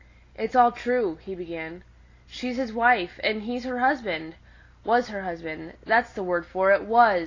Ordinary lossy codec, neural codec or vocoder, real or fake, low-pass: AAC, 32 kbps; none; real; 7.2 kHz